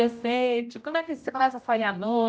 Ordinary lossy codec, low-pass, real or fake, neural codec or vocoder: none; none; fake; codec, 16 kHz, 0.5 kbps, X-Codec, HuBERT features, trained on general audio